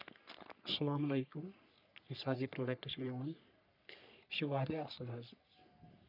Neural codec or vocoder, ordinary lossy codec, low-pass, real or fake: codec, 44.1 kHz, 3.4 kbps, Pupu-Codec; none; 5.4 kHz; fake